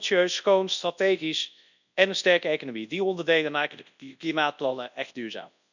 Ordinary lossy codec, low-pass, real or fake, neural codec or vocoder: none; 7.2 kHz; fake; codec, 24 kHz, 0.9 kbps, WavTokenizer, large speech release